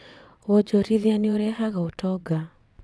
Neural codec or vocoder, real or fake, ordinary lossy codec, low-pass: vocoder, 22.05 kHz, 80 mel bands, WaveNeXt; fake; none; none